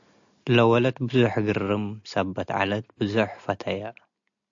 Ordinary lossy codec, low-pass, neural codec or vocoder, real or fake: AAC, 64 kbps; 7.2 kHz; none; real